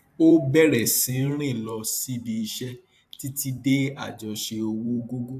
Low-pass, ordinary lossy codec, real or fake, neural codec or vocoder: 14.4 kHz; AAC, 96 kbps; fake; vocoder, 44.1 kHz, 128 mel bands every 512 samples, BigVGAN v2